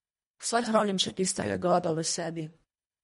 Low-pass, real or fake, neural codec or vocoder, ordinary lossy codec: 10.8 kHz; fake; codec, 24 kHz, 1.5 kbps, HILCodec; MP3, 48 kbps